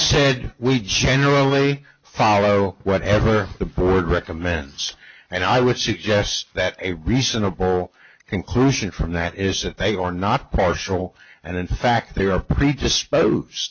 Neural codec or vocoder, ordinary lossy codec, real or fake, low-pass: none; AAC, 48 kbps; real; 7.2 kHz